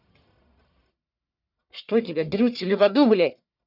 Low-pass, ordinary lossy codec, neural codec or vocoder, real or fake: 5.4 kHz; none; codec, 44.1 kHz, 1.7 kbps, Pupu-Codec; fake